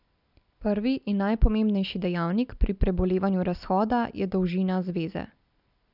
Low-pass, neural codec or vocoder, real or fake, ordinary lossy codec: 5.4 kHz; none; real; none